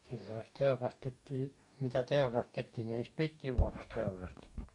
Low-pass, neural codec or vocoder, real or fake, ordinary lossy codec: 10.8 kHz; codec, 44.1 kHz, 2.6 kbps, DAC; fake; none